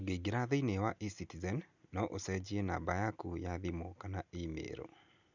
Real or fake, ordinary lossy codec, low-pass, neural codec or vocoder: real; none; 7.2 kHz; none